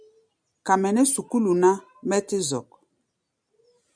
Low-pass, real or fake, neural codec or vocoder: 9.9 kHz; real; none